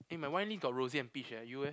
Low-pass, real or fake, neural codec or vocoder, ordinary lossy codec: none; real; none; none